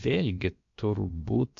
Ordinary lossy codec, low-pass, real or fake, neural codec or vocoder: MP3, 48 kbps; 7.2 kHz; fake; codec, 16 kHz, about 1 kbps, DyCAST, with the encoder's durations